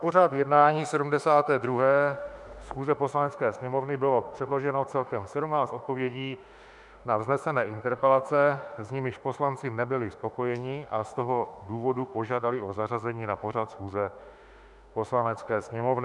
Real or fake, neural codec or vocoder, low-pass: fake; autoencoder, 48 kHz, 32 numbers a frame, DAC-VAE, trained on Japanese speech; 10.8 kHz